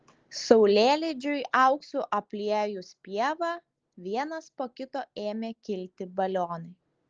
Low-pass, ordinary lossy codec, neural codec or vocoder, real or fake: 7.2 kHz; Opus, 16 kbps; none; real